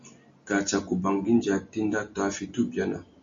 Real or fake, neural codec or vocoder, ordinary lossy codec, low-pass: real; none; MP3, 48 kbps; 7.2 kHz